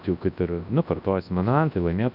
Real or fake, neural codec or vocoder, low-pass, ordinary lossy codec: fake; codec, 24 kHz, 0.9 kbps, WavTokenizer, large speech release; 5.4 kHz; AAC, 48 kbps